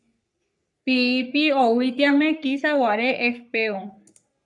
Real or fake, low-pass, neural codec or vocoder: fake; 10.8 kHz; codec, 44.1 kHz, 3.4 kbps, Pupu-Codec